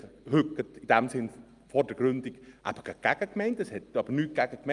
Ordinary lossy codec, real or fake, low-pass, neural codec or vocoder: Opus, 32 kbps; real; 10.8 kHz; none